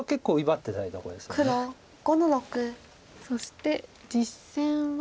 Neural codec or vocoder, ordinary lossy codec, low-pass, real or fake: none; none; none; real